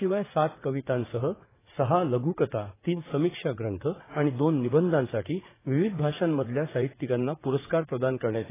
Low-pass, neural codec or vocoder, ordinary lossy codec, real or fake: 3.6 kHz; vocoder, 44.1 kHz, 80 mel bands, Vocos; AAC, 16 kbps; fake